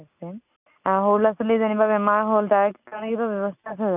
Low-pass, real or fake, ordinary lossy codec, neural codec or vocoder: 3.6 kHz; real; none; none